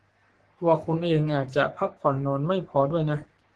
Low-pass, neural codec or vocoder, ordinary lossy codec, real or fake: 10.8 kHz; codec, 44.1 kHz, 3.4 kbps, Pupu-Codec; Opus, 16 kbps; fake